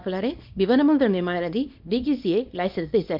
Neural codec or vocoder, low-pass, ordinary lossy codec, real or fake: codec, 24 kHz, 0.9 kbps, WavTokenizer, small release; 5.4 kHz; none; fake